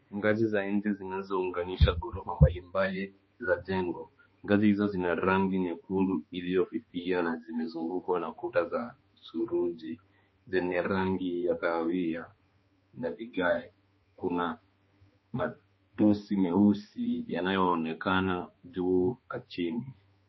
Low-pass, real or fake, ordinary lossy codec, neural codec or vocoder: 7.2 kHz; fake; MP3, 24 kbps; codec, 16 kHz, 2 kbps, X-Codec, HuBERT features, trained on balanced general audio